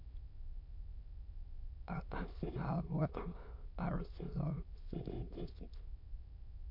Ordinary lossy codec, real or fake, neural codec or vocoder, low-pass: MP3, 48 kbps; fake; autoencoder, 22.05 kHz, a latent of 192 numbers a frame, VITS, trained on many speakers; 5.4 kHz